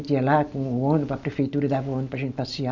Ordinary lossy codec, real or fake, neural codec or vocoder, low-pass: none; real; none; 7.2 kHz